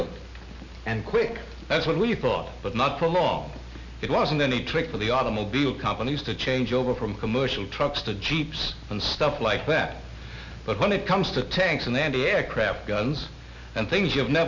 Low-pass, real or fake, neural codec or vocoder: 7.2 kHz; real; none